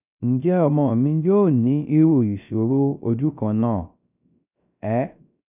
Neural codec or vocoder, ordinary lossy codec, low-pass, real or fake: codec, 16 kHz, 0.3 kbps, FocalCodec; none; 3.6 kHz; fake